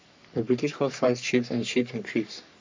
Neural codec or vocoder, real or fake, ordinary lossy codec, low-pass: codec, 44.1 kHz, 3.4 kbps, Pupu-Codec; fake; MP3, 48 kbps; 7.2 kHz